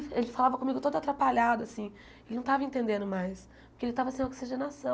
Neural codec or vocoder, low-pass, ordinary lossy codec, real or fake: none; none; none; real